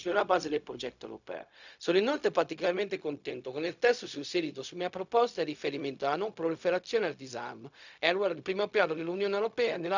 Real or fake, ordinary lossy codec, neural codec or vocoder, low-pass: fake; none; codec, 16 kHz, 0.4 kbps, LongCat-Audio-Codec; 7.2 kHz